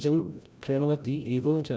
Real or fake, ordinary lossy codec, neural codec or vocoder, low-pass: fake; none; codec, 16 kHz, 0.5 kbps, FreqCodec, larger model; none